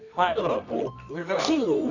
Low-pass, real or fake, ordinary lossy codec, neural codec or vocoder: 7.2 kHz; fake; none; codec, 24 kHz, 0.9 kbps, WavTokenizer, medium music audio release